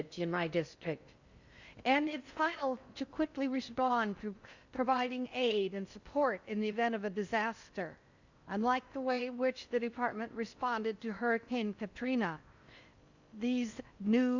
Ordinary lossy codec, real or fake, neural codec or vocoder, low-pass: Opus, 64 kbps; fake; codec, 16 kHz in and 24 kHz out, 0.6 kbps, FocalCodec, streaming, 4096 codes; 7.2 kHz